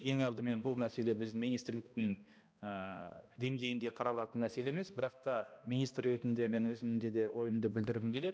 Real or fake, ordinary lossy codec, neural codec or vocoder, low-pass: fake; none; codec, 16 kHz, 1 kbps, X-Codec, HuBERT features, trained on balanced general audio; none